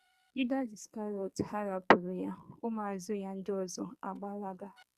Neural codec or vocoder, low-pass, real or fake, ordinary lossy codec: codec, 44.1 kHz, 2.6 kbps, SNAC; 14.4 kHz; fake; Opus, 64 kbps